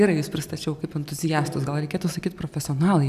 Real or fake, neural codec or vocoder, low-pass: real; none; 14.4 kHz